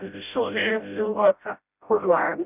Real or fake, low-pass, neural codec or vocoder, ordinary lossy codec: fake; 3.6 kHz; codec, 16 kHz, 0.5 kbps, FreqCodec, smaller model; none